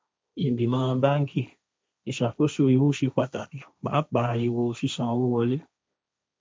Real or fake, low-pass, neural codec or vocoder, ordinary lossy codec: fake; none; codec, 16 kHz, 1.1 kbps, Voila-Tokenizer; none